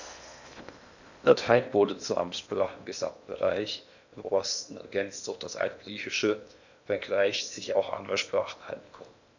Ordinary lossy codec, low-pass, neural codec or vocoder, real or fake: none; 7.2 kHz; codec, 16 kHz in and 24 kHz out, 0.8 kbps, FocalCodec, streaming, 65536 codes; fake